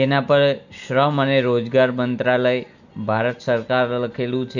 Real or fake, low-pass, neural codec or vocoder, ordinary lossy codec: real; 7.2 kHz; none; none